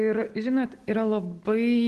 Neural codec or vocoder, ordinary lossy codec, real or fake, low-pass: none; Opus, 16 kbps; real; 10.8 kHz